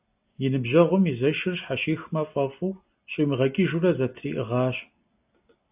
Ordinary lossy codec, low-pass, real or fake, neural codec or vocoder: AAC, 32 kbps; 3.6 kHz; real; none